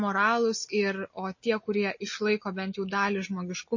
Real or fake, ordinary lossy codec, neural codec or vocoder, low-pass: real; MP3, 32 kbps; none; 7.2 kHz